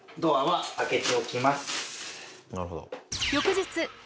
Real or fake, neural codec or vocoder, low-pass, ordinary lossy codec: real; none; none; none